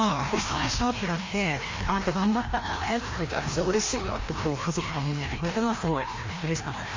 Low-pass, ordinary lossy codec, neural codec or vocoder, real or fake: 7.2 kHz; MP3, 32 kbps; codec, 16 kHz, 1 kbps, FreqCodec, larger model; fake